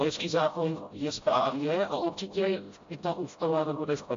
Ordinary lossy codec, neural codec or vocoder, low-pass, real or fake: MP3, 48 kbps; codec, 16 kHz, 0.5 kbps, FreqCodec, smaller model; 7.2 kHz; fake